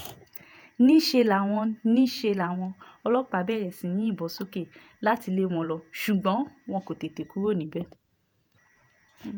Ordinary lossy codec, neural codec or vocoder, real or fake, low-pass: none; vocoder, 48 kHz, 128 mel bands, Vocos; fake; none